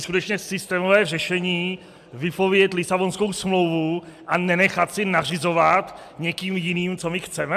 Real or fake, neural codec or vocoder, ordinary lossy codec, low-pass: real; none; AAC, 96 kbps; 14.4 kHz